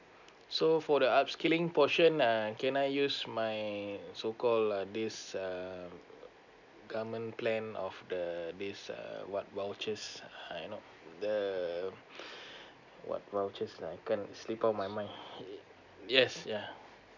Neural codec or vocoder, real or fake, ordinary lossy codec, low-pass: none; real; none; 7.2 kHz